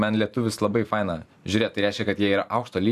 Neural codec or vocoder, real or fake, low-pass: vocoder, 44.1 kHz, 128 mel bands every 512 samples, BigVGAN v2; fake; 14.4 kHz